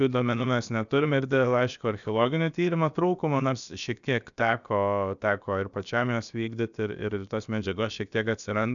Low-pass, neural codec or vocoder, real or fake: 7.2 kHz; codec, 16 kHz, about 1 kbps, DyCAST, with the encoder's durations; fake